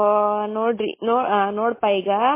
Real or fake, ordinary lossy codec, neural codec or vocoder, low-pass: real; MP3, 16 kbps; none; 3.6 kHz